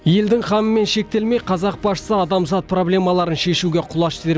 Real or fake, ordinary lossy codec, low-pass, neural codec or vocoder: real; none; none; none